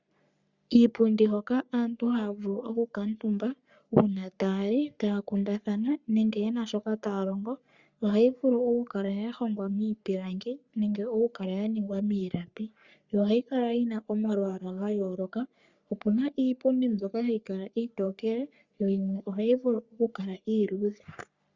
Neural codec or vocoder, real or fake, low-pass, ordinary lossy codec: codec, 44.1 kHz, 3.4 kbps, Pupu-Codec; fake; 7.2 kHz; Opus, 64 kbps